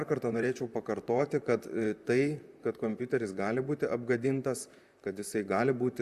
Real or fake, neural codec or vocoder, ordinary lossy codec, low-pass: fake; vocoder, 44.1 kHz, 128 mel bands, Pupu-Vocoder; Opus, 64 kbps; 14.4 kHz